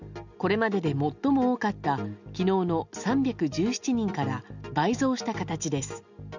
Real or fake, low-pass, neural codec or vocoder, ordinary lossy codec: real; 7.2 kHz; none; none